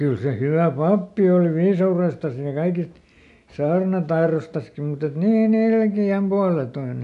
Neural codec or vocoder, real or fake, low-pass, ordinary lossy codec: none; real; 10.8 kHz; none